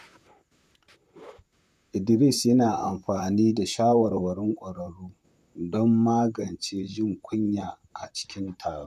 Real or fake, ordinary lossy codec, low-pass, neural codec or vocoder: fake; none; 14.4 kHz; vocoder, 44.1 kHz, 128 mel bands, Pupu-Vocoder